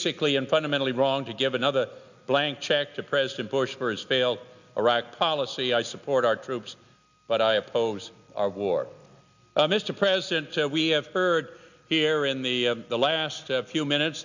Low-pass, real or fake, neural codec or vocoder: 7.2 kHz; real; none